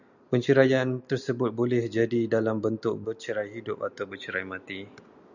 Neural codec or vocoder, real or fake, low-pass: none; real; 7.2 kHz